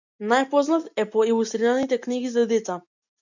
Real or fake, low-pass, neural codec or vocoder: real; 7.2 kHz; none